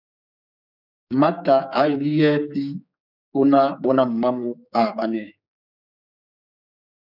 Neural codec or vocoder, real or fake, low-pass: codec, 16 kHz, 4 kbps, X-Codec, HuBERT features, trained on general audio; fake; 5.4 kHz